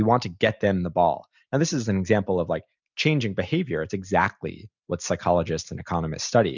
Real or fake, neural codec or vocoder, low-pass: real; none; 7.2 kHz